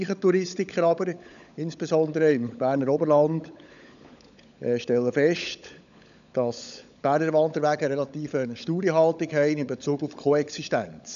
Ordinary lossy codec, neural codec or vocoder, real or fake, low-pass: none; codec, 16 kHz, 16 kbps, FunCodec, trained on LibriTTS, 50 frames a second; fake; 7.2 kHz